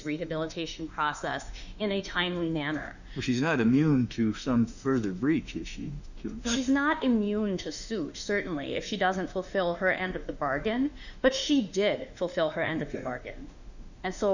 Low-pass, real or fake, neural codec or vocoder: 7.2 kHz; fake; autoencoder, 48 kHz, 32 numbers a frame, DAC-VAE, trained on Japanese speech